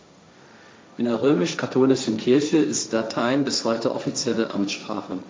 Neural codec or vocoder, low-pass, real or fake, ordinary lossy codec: codec, 16 kHz, 1.1 kbps, Voila-Tokenizer; none; fake; none